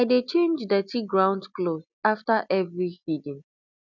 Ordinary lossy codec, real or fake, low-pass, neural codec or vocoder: none; real; 7.2 kHz; none